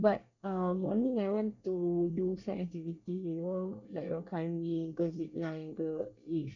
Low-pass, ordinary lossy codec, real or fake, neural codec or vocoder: 7.2 kHz; none; fake; codec, 24 kHz, 1 kbps, SNAC